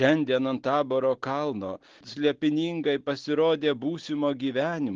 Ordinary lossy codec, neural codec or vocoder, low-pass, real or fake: Opus, 16 kbps; none; 7.2 kHz; real